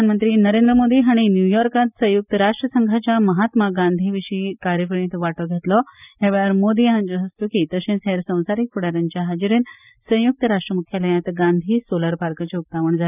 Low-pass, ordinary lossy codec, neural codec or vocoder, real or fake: 3.6 kHz; none; none; real